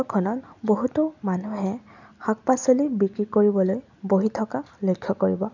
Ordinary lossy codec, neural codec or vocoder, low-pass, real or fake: none; none; 7.2 kHz; real